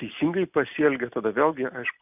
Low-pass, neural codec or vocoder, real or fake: 3.6 kHz; none; real